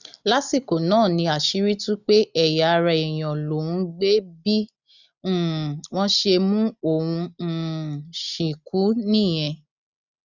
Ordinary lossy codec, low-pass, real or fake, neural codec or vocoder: none; 7.2 kHz; real; none